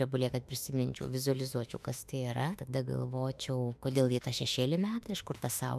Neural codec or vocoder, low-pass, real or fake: autoencoder, 48 kHz, 32 numbers a frame, DAC-VAE, trained on Japanese speech; 14.4 kHz; fake